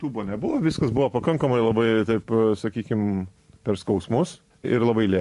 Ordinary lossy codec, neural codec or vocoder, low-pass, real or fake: MP3, 48 kbps; vocoder, 44.1 kHz, 128 mel bands every 512 samples, BigVGAN v2; 14.4 kHz; fake